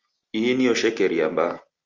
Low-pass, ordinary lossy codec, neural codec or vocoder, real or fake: 7.2 kHz; Opus, 32 kbps; none; real